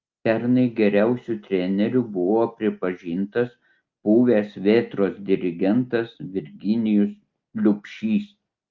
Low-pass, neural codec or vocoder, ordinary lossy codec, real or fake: 7.2 kHz; none; Opus, 32 kbps; real